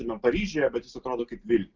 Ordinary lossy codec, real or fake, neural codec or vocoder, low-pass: Opus, 24 kbps; real; none; 7.2 kHz